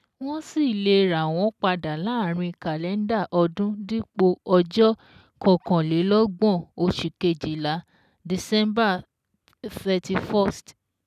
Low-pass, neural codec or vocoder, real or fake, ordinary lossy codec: 14.4 kHz; none; real; none